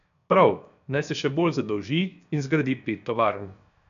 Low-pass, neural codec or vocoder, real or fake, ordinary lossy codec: 7.2 kHz; codec, 16 kHz, 0.7 kbps, FocalCodec; fake; none